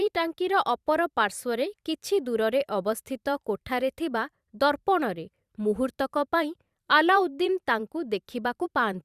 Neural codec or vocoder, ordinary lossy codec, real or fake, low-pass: vocoder, 44.1 kHz, 128 mel bands every 512 samples, BigVGAN v2; Opus, 64 kbps; fake; 14.4 kHz